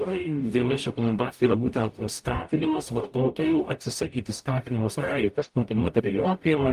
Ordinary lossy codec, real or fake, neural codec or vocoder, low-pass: Opus, 32 kbps; fake; codec, 44.1 kHz, 0.9 kbps, DAC; 14.4 kHz